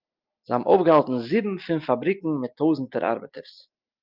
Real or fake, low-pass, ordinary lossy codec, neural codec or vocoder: real; 5.4 kHz; Opus, 24 kbps; none